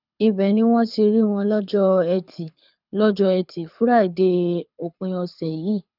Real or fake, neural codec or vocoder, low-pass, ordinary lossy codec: fake; codec, 24 kHz, 6 kbps, HILCodec; 5.4 kHz; none